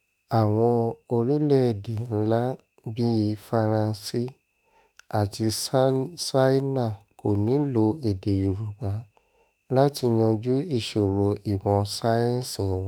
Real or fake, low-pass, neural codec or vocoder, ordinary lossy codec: fake; none; autoencoder, 48 kHz, 32 numbers a frame, DAC-VAE, trained on Japanese speech; none